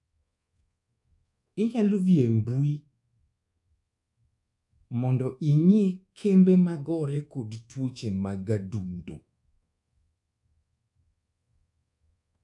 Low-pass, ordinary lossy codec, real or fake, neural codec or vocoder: 10.8 kHz; MP3, 96 kbps; fake; codec, 24 kHz, 1.2 kbps, DualCodec